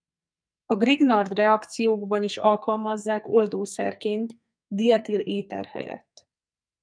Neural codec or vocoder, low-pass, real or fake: codec, 44.1 kHz, 2.6 kbps, SNAC; 9.9 kHz; fake